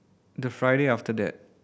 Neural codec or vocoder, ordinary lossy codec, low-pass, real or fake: none; none; none; real